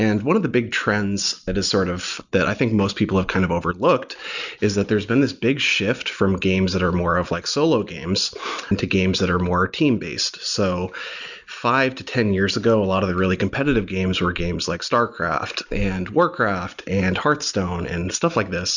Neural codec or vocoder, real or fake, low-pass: none; real; 7.2 kHz